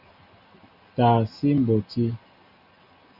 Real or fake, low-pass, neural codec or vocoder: real; 5.4 kHz; none